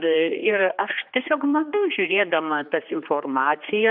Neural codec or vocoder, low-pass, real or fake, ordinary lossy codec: codec, 16 kHz, 2 kbps, X-Codec, HuBERT features, trained on general audio; 5.4 kHz; fake; AAC, 48 kbps